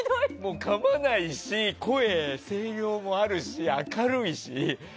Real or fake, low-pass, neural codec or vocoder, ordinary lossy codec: real; none; none; none